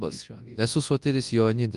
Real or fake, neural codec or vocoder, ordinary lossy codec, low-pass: fake; codec, 24 kHz, 0.9 kbps, WavTokenizer, large speech release; Opus, 32 kbps; 10.8 kHz